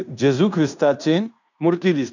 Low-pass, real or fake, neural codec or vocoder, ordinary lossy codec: 7.2 kHz; fake; codec, 16 kHz in and 24 kHz out, 0.9 kbps, LongCat-Audio-Codec, fine tuned four codebook decoder; none